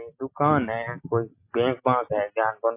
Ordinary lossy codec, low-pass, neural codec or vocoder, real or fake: MP3, 24 kbps; 3.6 kHz; none; real